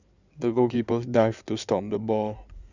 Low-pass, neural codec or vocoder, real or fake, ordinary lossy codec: 7.2 kHz; codec, 16 kHz in and 24 kHz out, 2.2 kbps, FireRedTTS-2 codec; fake; none